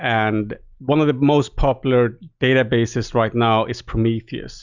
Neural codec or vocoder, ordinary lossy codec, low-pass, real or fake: none; Opus, 64 kbps; 7.2 kHz; real